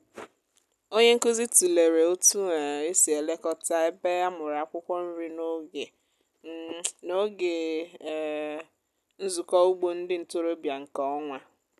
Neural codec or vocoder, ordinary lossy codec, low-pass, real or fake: none; none; 14.4 kHz; real